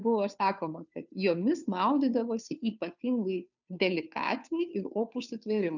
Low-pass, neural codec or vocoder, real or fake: 7.2 kHz; codec, 16 kHz, 2 kbps, FunCodec, trained on Chinese and English, 25 frames a second; fake